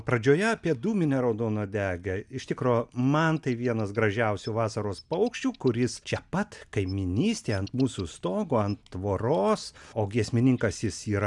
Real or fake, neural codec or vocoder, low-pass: real; none; 10.8 kHz